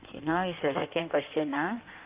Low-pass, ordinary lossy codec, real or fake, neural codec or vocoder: 3.6 kHz; Opus, 24 kbps; fake; codec, 16 kHz in and 24 kHz out, 1.1 kbps, FireRedTTS-2 codec